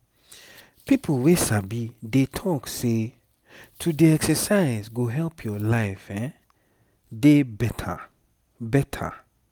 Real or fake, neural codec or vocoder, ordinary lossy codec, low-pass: real; none; none; none